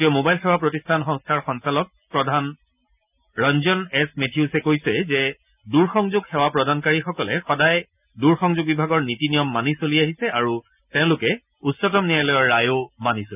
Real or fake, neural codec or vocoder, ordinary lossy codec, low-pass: real; none; none; 3.6 kHz